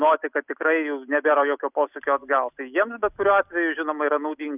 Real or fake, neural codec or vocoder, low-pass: real; none; 3.6 kHz